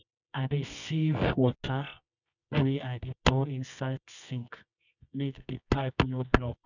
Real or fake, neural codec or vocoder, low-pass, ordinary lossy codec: fake; codec, 24 kHz, 0.9 kbps, WavTokenizer, medium music audio release; 7.2 kHz; none